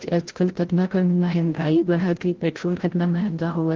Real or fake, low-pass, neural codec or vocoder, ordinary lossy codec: fake; 7.2 kHz; codec, 16 kHz, 0.5 kbps, FreqCodec, larger model; Opus, 16 kbps